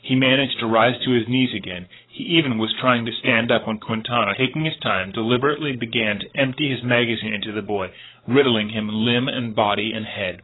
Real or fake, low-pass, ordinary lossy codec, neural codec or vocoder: fake; 7.2 kHz; AAC, 16 kbps; codec, 16 kHz, 8 kbps, FreqCodec, larger model